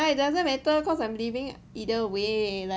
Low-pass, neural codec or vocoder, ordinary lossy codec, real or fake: none; none; none; real